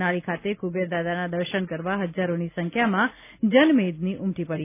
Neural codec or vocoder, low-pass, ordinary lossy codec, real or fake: none; 3.6 kHz; none; real